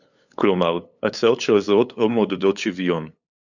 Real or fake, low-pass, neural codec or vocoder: fake; 7.2 kHz; codec, 16 kHz, 8 kbps, FunCodec, trained on LibriTTS, 25 frames a second